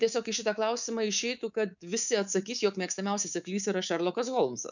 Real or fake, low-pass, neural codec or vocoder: fake; 7.2 kHz; codec, 24 kHz, 3.1 kbps, DualCodec